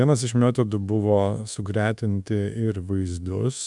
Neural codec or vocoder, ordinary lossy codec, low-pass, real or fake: codec, 24 kHz, 1.2 kbps, DualCodec; MP3, 96 kbps; 10.8 kHz; fake